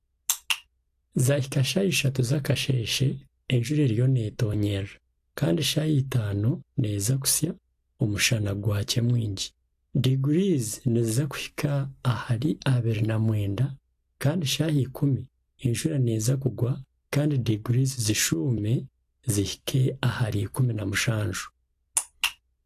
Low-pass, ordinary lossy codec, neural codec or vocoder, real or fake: 14.4 kHz; AAC, 64 kbps; none; real